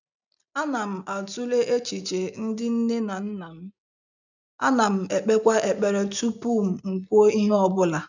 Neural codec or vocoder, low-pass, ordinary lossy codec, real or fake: none; 7.2 kHz; none; real